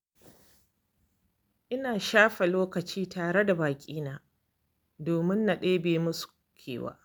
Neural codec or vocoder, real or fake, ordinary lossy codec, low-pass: none; real; none; none